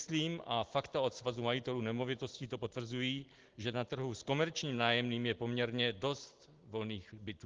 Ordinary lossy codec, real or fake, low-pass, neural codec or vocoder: Opus, 16 kbps; real; 7.2 kHz; none